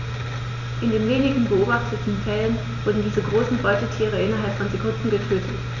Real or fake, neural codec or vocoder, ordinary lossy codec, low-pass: real; none; none; 7.2 kHz